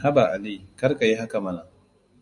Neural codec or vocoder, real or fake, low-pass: none; real; 10.8 kHz